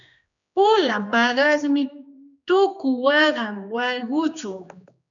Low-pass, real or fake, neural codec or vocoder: 7.2 kHz; fake; codec, 16 kHz, 2 kbps, X-Codec, HuBERT features, trained on general audio